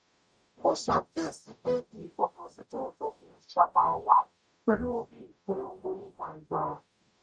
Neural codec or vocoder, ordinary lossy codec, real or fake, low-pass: codec, 44.1 kHz, 0.9 kbps, DAC; none; fake; 9.9 kHz